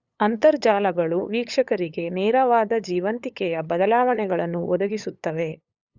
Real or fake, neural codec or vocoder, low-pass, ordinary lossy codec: fake; codec, 16 kHz, 8 kbps, FunCodec, trained on LibriTTS, 25 frames a second; 7.2 kHz; Opus, 64 kbps